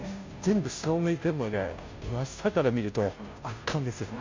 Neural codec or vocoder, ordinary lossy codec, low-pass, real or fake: codec, 16 kHz, 0.5 kbps, FunCodec, trained on Chinese and English, 25 frames a second; MP3, 64 kbps; 7.2 kHz; fake